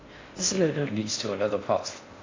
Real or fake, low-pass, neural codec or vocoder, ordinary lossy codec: fake; 7.2 kHz; codec, 16 kHz in and 24 kHz out, 0.6 kbps, FocalCodec, streaming, 4096 codes; AAC, 32 kbps